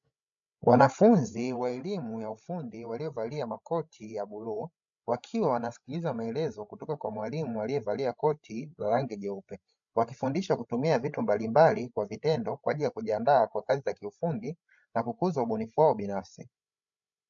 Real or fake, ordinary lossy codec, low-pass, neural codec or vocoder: fake; MP3, 64 kbps; 7.2 kHz; codec, 16 kHz, 16 kbps, FreqCodec, larger model